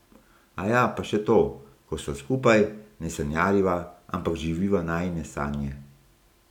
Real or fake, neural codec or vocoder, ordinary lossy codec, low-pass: real; none; none; 19.8 kHz